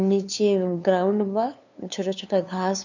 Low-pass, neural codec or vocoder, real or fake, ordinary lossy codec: 7.2 kHz; codec, 16 kHz, 2 kbps, FunCodec, trained on LibriTTS, 25 frames a second; fake; none